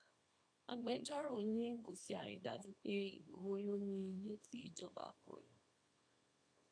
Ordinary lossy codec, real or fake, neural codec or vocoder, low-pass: AAC, 64 kbps; fake; codec, 24 kHz, 0.9 kbps, WavTokenizer, small release; 9.9 kHz